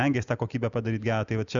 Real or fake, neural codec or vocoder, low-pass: real; none; 7.2 kHz